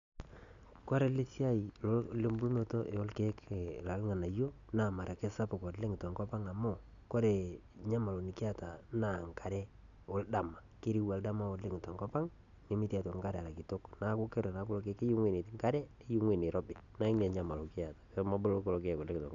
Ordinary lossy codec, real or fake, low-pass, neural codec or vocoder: none; real; 7.2 kHz; none